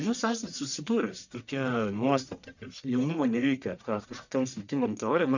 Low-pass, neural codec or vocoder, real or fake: 7.2 kHz; codec, 44.1 kHz, 1.7 kbps, Pupu-Codec; fake